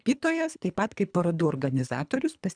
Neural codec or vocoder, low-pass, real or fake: codec, 24 kHz, 3 kbps, HILCodec; 9.9 kHz; fake